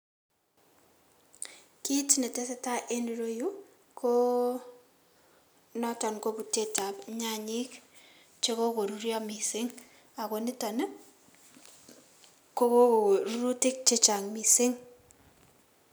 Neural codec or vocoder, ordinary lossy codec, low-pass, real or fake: none; none; none; real